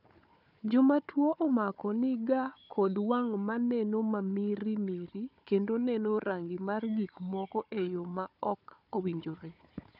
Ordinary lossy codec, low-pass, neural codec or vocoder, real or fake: none; 5.4 kHz; codec, 16 kHz, 4 kbps, FunCodec, trained on Chinese and English, 50 frames a second; fake